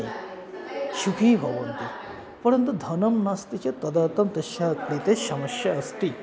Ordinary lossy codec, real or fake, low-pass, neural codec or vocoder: none; real; none; none